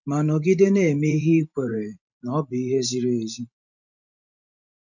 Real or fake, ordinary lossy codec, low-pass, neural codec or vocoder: fake; none; 7.2 kHz; vocoder, 44.1 kHz, 128 mel bands every 512 samples, BigVGAN v2